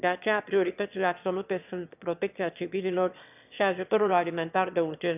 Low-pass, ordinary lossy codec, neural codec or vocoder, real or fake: 3.6 kHz; none; autoencoder, 22.05 kHz, a latent of 192 numbers a frame, VITS, trained on one speaker; fake